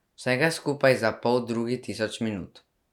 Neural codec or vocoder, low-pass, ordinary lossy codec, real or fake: none; 19.8 kHz; none; real